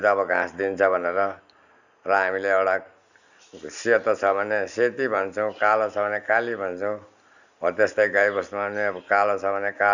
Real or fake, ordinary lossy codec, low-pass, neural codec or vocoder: real; none; 7.2 kHz; none